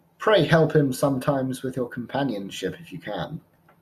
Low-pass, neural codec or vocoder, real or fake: 14.4 kHz; none; real